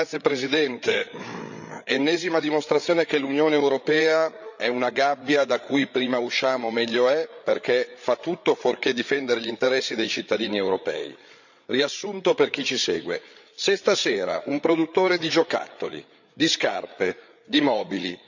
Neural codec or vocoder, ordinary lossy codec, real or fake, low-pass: codec, 16 kHz, 8 kbps, FreqCodec, larger model; none; fake; 7.2 kHz